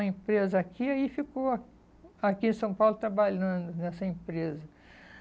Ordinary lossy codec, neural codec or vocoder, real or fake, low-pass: none; none; real; none